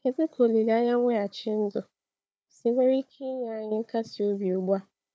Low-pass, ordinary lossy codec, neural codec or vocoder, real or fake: none; none; codec, 16 kHz, 4 kbps, FunCodec, trained on Chinese and English, 50 frames a second; fake